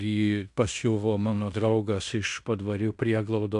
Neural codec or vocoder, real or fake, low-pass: codec, 16 kHz in and 24 kHz out, 0.9 kbps, LongCat-Audio-Codec, fine tuned four codebook decoder; fake; 10.8 kHz